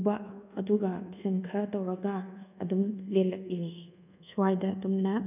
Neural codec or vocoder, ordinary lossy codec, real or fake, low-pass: codec, 24 kHz, 1.2 kbps, DualCodec; none; fake; 3.6 kHz